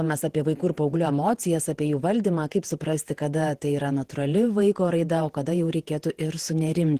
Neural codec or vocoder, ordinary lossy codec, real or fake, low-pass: vocoder, 48 kHz, 128 mel bands, Vocos; Opus, 16 kbps; fake; 14.4 kHz